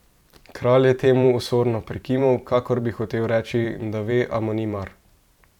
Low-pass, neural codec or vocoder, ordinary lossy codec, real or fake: 19.8 kHz; vocoder, 44.1 kHz, 128 mel bands every 512 samples, BigVGAN v2; none; fake